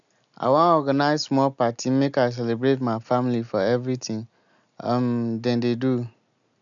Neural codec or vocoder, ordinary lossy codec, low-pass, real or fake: none; none; 7.2 kHz; real